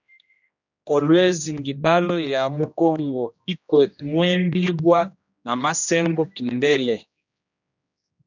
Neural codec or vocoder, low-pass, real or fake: codec, 16 kHz, 1 kbps, X-Codec, HuBERT features, trained on general audio; 7.2 kHz; fake